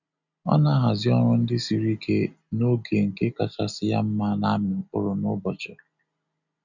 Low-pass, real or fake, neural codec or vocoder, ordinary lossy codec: 7.2 kHz; real; none; none